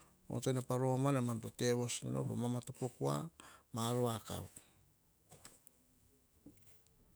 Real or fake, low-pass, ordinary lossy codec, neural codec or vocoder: fake; none; none; autoencoder, 48 kHz, 128 numbers a frame, DAC-VAE, trained on Japanese speech